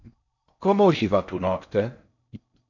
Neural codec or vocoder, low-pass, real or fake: codec, 16 kHz in and 24 kHz out, 0.6 kbps, FocalCodec, streaming, 4096 codes; 7.2 kHz; fake